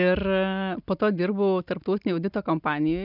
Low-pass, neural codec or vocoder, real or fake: 5.4 kHz; none; real